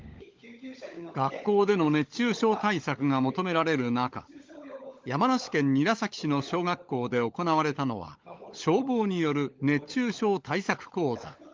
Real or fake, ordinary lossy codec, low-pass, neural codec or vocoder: fake; Opus, 32 kbps; 7.2 kHz; codec, 16 kHz, 16 kbps, FunCodec, trained on LibriTTS, 50 frames a second